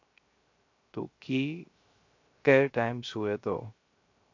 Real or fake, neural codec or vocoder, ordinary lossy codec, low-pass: fake; codec, 16 kHz, 0.3 kbps, FocalCodec; MP3, 48 kbps; 7.2 kHz